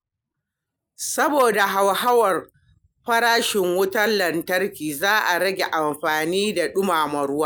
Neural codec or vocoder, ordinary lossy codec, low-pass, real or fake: none; none; none; real